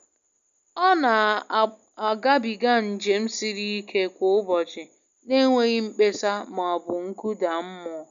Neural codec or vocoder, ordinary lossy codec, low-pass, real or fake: none; none; 7.2 kHz; real